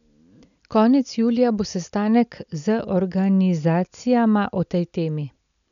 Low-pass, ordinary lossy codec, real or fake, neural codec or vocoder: 7.2 kHz; none; real; none